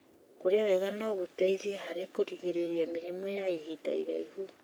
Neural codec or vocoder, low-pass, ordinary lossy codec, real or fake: codec, 44.1 kHz, 3.4 kbps, Pupu-Codec; none; none; fake